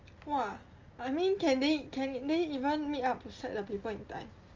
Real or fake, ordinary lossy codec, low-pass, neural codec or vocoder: real; Opus, 32 kbps; 7.2 kHz; none